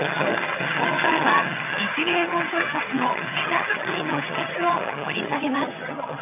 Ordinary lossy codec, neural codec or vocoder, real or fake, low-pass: none; vocoder, 22.05 kHz, 80 mel bands, HiFi-GAN; fake; 3.6 kHz